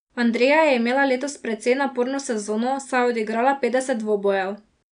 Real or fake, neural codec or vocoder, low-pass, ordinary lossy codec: real; none; 9.9 kHz; none